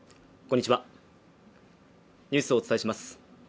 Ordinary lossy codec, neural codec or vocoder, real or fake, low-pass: none; none; real; none